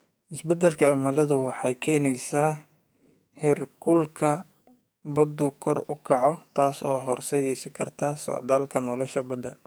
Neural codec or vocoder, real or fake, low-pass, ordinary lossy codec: codec, 44.1 kHz, 2.6 kbps, SNAC; fake; none; none